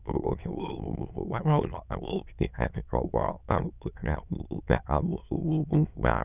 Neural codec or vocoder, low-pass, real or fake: autoencoder, 22.05 kHz, a latent of 192 numbers a frame, VITS, trained on many speakers; 3.6 kHz; fake